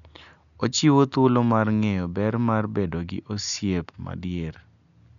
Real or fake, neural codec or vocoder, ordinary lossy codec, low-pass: real; none; none; 7.2 kHz